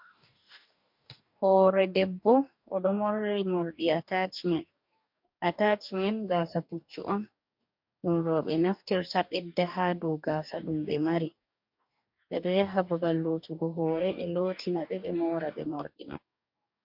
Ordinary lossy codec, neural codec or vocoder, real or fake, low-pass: MP3, 48 kbps; codec, 44.1 kHz, 2.6 kbps, DAC; fake; 5.4 kHz